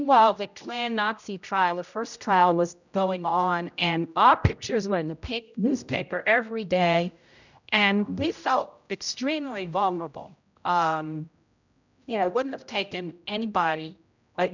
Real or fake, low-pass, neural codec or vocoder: fake; 7.2 kHz; codec, 16 kHz, 0.5 kbps, X-Codec, HuBERT features, trained on general audio